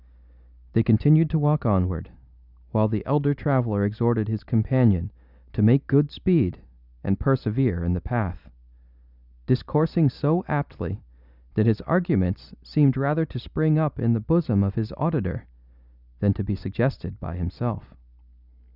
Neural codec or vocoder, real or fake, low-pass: none; real; 5.4 kHz